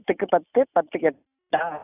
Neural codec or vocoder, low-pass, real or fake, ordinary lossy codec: none; 3.6 kHz; real; none